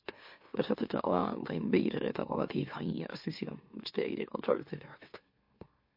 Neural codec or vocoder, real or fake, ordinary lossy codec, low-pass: autoencoder, 44.1 kHz, a latent of 192 numbers a frame, MeloTTS; fake; MP3, 32 kbps; 5.4 kHz